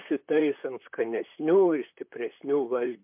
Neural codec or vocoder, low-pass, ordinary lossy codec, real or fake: vocoder, 44.1 kHz, 128 mel bands, Pupu-Vocoder; 3.6 kHz; MP3, 32 kbps; fake